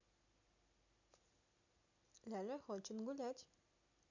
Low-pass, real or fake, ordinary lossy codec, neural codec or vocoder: 7.2 kHz; real; none; none